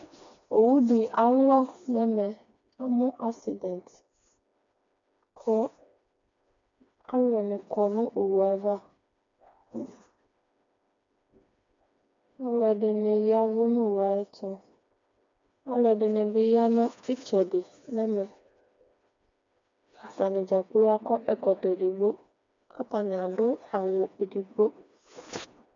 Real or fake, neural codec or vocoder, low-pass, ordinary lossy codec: fake; codec, 16 kHz, 2 kbps, FreqCodec, smaller model; 7.2 kHz; MP3, 64 kbps